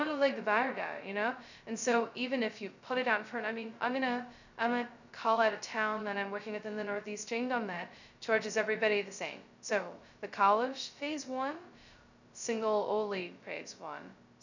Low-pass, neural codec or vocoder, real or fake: 7.2 kHz; codec, 16 kHz, 0.2 kbps, FocalCodec; fake